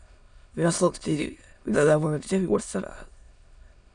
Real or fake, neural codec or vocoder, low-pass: fake; autoencoder, 22.05 kHz, a latent of 192 numbers a frame, VITS, trained on many speakers; 9.9 kHz